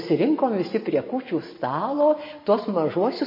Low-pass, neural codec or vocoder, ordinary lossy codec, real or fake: 5.4 kHz; none; MP3, 24 kbps; real